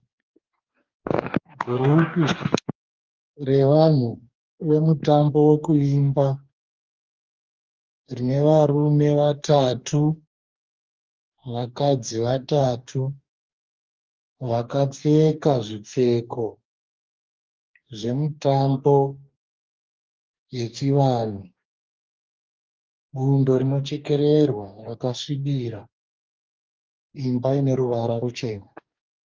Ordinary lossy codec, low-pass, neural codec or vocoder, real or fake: Opus, 32 kbps; 7.2 kHz; codec, 44.1 kHz, 2.6 kbps, DAC; fake